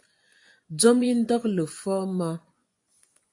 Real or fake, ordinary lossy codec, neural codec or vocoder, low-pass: fake; AAC, 64 kbps; vocoder, 24 kHz, 100 mel bands, Vocos; 10.8 kHz